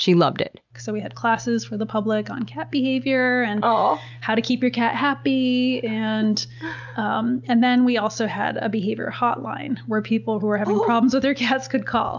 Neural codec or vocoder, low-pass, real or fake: none; 7.2 kHz; real